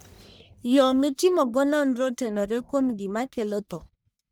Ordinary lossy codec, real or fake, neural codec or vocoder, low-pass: none; fake; codec, 44.1 kHz, 1.7 kbps, Pupu-Codec; none